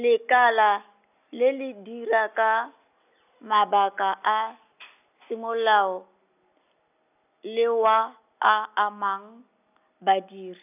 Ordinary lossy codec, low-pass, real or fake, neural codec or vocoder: none; 3.6 kHz; real; none